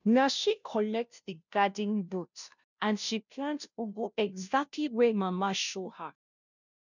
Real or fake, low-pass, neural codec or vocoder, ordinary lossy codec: fake; 7.2 kHz; codec, 16 kHz, 0.5 kbps, FunCodec, trained on Chinese and English, 25 frames a second; none